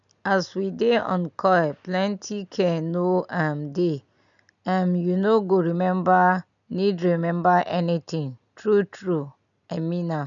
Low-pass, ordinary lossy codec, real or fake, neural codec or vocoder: 7.2 kHz; none; real; none